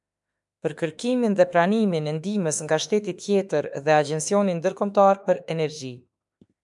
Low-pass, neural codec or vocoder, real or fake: 10.8 kHz; autoencoder, 48 kHz, 32 numbers a frame, DAC-VAE, trained on Japanese speech; fake